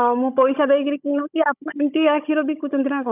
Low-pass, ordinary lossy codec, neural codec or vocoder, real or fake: 3.6 kHz; none; codec, 16 kHz, 16 kbps, FunCodec, trained on Chinese and English, 50 frames a second; fake